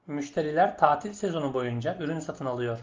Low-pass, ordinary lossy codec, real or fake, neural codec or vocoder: 7.2 kHz; Opus, 32 kbps; real; none